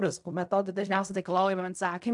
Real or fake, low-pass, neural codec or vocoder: fake; 10.8 kHz; codec, 16 kHz in and 24 kHz out, 0.4 kbps, LongCat-Audio-Codec, fine tuned four codebook decoder